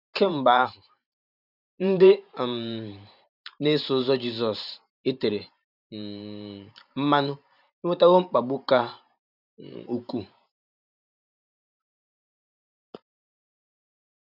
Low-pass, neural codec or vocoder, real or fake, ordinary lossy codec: 5.4 kHz; vocoder, 44.1 kHz, 128 mel bands every 512 samples, BigVGAN v2; fake; none